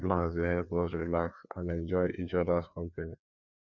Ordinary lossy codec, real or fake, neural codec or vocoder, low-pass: none; fake; codec, 16 kHz, 2 kbps, FreqCodec, larger model; 7.2 kHz